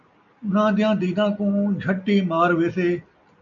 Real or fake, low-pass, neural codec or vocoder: real; 7.2 kHz; none